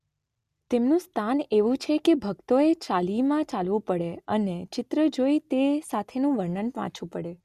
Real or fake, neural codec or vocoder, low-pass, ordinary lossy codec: real; none; 14.4 kHz; Opus, 64 kbps